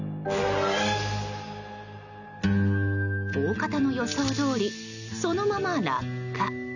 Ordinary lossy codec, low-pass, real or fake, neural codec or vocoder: none; 7.2 kHz; real; none